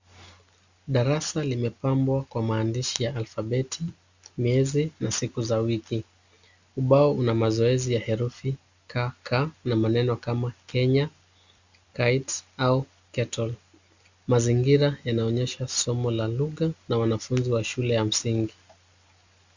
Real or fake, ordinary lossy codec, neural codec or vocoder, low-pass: real; Opus, 64 kbps; none; 7.2 kHz